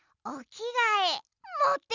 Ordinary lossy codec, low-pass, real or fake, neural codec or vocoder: none; 7.2 kHz; real; none